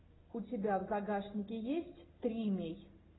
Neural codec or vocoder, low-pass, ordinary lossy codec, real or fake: none; 7.2 kHz; AAC, 16 kbps; real